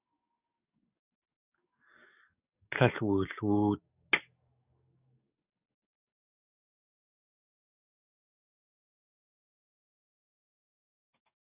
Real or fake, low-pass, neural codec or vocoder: fake; 3.6 kHz; codec, 44.1 kHz, 7.8 kbps, DAC